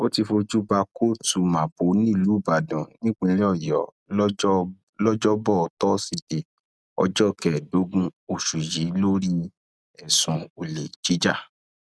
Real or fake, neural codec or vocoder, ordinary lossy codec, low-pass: real; none; none; none